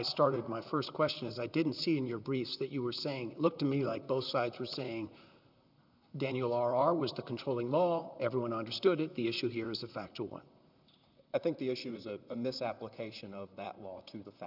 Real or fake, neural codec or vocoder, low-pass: fake; vocoder, 44.1 kHz, 128 mel bands, Pupu-Vocoder; 5.4 kHz